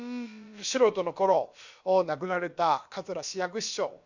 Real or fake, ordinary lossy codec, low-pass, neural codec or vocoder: fake; Opus, 64 kbps; 7.2 kHz; codec, 16 kHz, about 1 kbps, DyCAST, with the encoder's durations